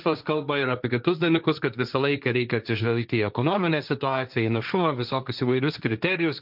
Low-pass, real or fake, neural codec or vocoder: 5.4 kHz; fake; codec, 16 kHz, 1.1 kbps, Voila-Tokenizer